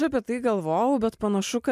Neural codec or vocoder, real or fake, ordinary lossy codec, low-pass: none; real; MP3, 96 kbps; 14.4 kHz